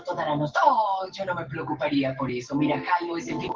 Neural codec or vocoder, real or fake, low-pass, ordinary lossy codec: none; real; 7.2 kHz; Opus, 16 kbps